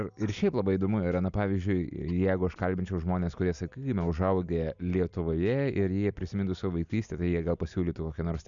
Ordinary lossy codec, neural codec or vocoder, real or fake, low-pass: MP3, 96 kbps; none; real; 7.2 kHz